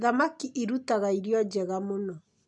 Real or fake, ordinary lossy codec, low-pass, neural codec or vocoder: real; none; 10.8 kHz; none